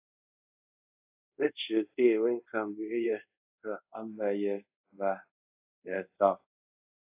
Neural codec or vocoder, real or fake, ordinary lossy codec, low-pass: codec, 24 kHz, 0.5 kbps, DualCodec; fake; AAC, 32 kbps; 3.6 kHz